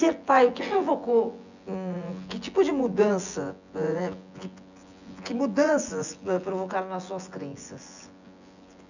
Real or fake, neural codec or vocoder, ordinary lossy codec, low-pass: fake; vocoder, 24 kHz, 100 mel bands, Vocos; none; 7.2 kHz